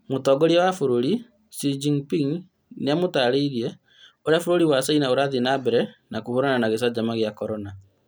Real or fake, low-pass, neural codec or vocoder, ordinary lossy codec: real; none; none; none